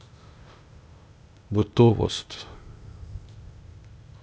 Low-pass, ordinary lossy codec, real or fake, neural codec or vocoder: none; none; fake; codec, 16 kHz, 0.8 kbps, ZipCodec